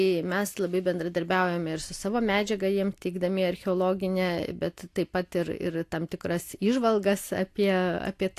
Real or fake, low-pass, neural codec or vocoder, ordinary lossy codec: real; 14.4 kHz; none; AAC, 64 kbps